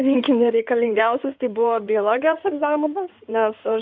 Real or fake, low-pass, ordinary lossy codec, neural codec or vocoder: fake; 7.2 kHz; MP3, 64 kbps; codec, 16 kHz in and 24 kHz out, 2.2 kbps, FireRedTTS-2 codec